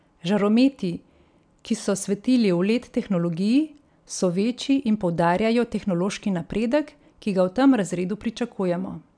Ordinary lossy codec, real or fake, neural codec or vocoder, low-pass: none; real; none; 9.9 kHz